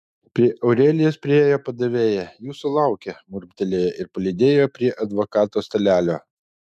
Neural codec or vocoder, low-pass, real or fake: autoencoder, 48 kHz, 128 numbers a frame, DAC-VAE, trained on Japanese speech; 14.4 kHz; fake